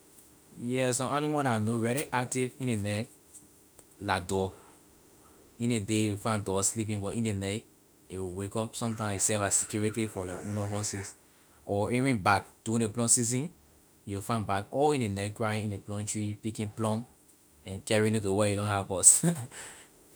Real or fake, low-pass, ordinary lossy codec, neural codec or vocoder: fake; none; none; autoencoder, 48 kHz, 32 numbers a frame, DAC-VAE, trained on Japanese speech